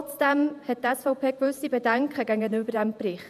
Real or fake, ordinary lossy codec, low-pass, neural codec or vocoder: fake; none; 14.4 kHz; vocoder, 48 kHz, 128 mel bands, Vocos